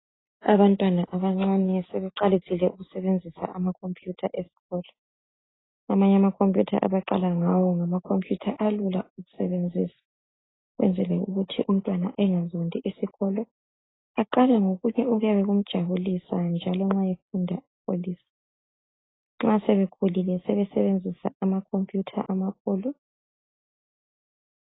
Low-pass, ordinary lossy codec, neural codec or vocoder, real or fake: 7.2 kHz; AAC, 16 kbps; none; real